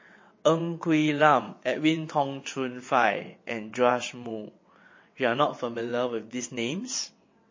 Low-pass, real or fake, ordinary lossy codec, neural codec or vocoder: 7.2 kHz; fake; MP3, 32 kbps; vocoder, 22.05 kHz, 80 mel bands, WaveNeXt